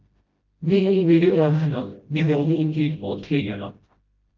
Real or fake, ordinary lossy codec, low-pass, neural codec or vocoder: fake; Opus, 24 kbps; 7.2 kHz; codec, 16 kHz, 0.5 kbps, FreqCodec, smaller model